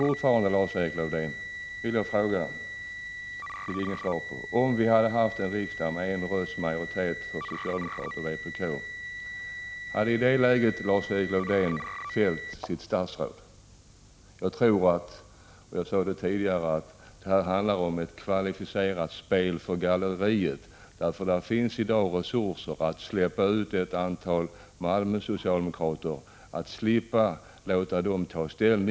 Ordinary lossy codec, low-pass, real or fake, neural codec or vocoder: none; none; real; none